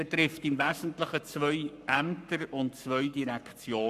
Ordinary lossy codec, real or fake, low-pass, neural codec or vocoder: none; fake; 14.4 kHz; codec, 44.1 kHz, 7.8 kbps, Pupu-Codec